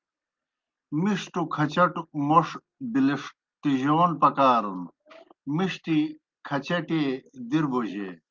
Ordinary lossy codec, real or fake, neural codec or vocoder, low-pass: Opus, 24 kbps; real; none; 7.2 kHz